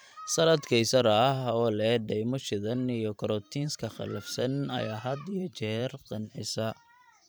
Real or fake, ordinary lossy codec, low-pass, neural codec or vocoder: fake; none; none; vocoder, 44.1 kHz, 128 mel bands every 512 samples, BigVGAN v2